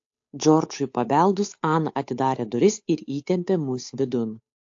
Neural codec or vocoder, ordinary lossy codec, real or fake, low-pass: codec, 16 kHz, 8 kbps, FunCodec, trained on Chinese and English, 25 frames a second; AAC, 48 kbps; fake; 7.2 kHz